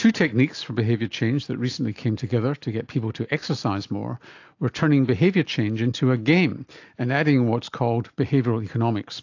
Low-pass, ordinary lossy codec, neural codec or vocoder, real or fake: 7.2 kHz; AAC, 48 kbps; none; real